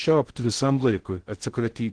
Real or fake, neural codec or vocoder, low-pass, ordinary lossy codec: fake; codec, 16 kHz in and 24 kHz out, 0.6 kbps, FocalCodec, streaming, 2048 codes; 9.9 kHz; Opus, 16 kbps